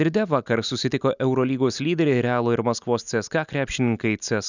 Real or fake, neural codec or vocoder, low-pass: real; none; 7.2 kHz